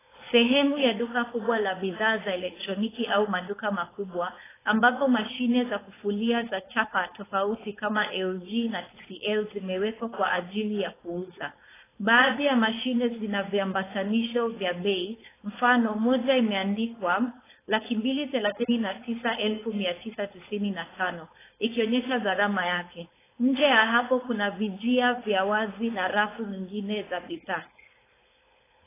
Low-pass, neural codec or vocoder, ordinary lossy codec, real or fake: 3.6 kHz; codec, 16 kHz, 4.8 kbps, FACodec; AAC, 16 kbps; fake